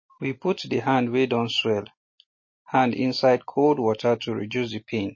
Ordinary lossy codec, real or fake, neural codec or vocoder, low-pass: MP3, 32 kbps; real; none; 7.2 kHz